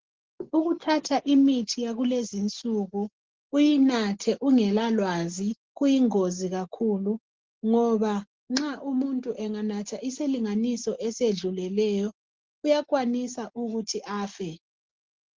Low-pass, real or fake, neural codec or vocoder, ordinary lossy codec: 7.2 kHz; real; none; Opus, 16 kbps